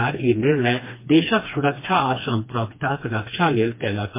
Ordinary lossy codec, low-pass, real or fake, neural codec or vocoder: MP3, 24 kbps; 3.6 kHz; fake; codec, 16 kHz, 2 kbps, FreqCodec, smaller model